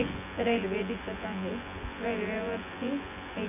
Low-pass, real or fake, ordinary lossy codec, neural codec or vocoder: 3.6 kHz; fake; none; vocoder, 24 kHz, 100 mel bands, Vocos